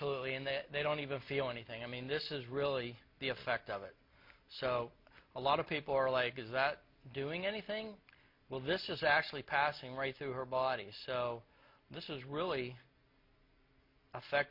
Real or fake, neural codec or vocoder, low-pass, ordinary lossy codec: real; none; 5.4 kHz; MP3, 32 kbps